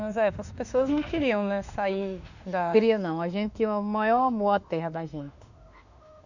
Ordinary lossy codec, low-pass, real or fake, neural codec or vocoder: none; 7.2 kHz; fake; autoencoder, 48 kHz, 32 numbers a frame, DAC-VAE, trained on Japanese speech